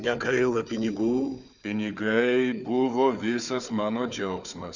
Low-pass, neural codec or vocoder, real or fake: 7.2 kHz; codec, 16 kHz, 4 kbps, FunCodec, trained on Chinese and English, 50 frames a second; fake